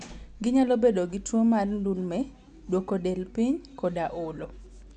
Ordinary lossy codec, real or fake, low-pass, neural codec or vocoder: none; real; 10.8 kHz; none